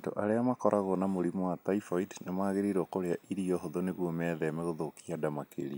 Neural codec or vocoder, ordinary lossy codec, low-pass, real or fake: none; none; none; real